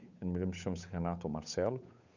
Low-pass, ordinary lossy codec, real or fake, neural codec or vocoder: 7.2 kHz; none; fake; codec, 16 kHz, 8 kbps, FunCodec, trained on Chinese and English, 25 frames a second